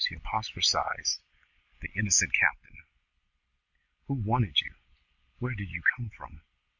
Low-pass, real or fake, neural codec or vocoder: 7.2 kHz; real; none